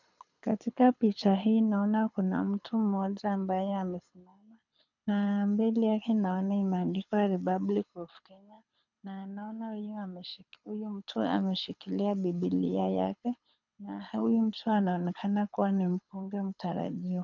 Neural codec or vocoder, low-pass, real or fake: codec, 24 kHz, 6 kbps, HILCodec; 7.2 kHz; fake